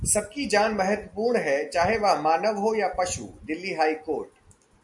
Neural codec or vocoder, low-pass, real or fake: none; 10.8 kHz; real